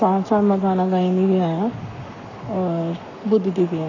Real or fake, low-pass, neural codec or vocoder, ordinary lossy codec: fake; 7.2 kHz; codec, 44.1 kHz, 7.8 kbps, Pupu-Codec; none